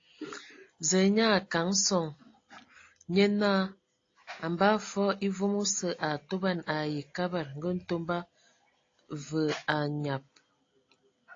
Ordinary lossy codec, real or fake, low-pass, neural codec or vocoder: AAC, 32 kbps; real; 7.2 kHz; none